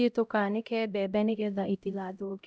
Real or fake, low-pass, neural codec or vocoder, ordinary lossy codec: fake; none; codec, 16 kHz, 0.5 kbps, X-Codec, HuBERT features, trained on LibriSpeech; none